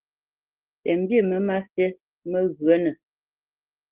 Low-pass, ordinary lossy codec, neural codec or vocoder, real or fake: 3.6 kHz; Opus, 16 kbps; none; real